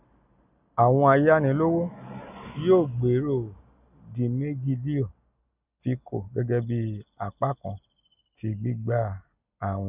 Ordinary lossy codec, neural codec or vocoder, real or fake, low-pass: none; none; real; 3.6 kHz